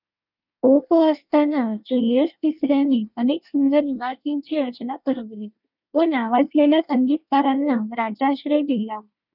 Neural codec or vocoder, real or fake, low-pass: codec, 24 kHz, 1 kbps, SNAC; fake; 5.4 kHz